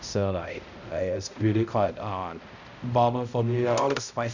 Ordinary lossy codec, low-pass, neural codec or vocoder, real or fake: none; 7.2 kHz; codec, 16 kHz, 0.5 kbps, X-Codec, HuBERT features, trained on balanced general audio; fake